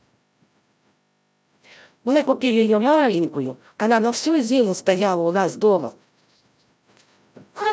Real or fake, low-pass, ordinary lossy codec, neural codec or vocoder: fake; none; none; codec, 16 kHz, 0.5 kbps, FreqCodec, larger model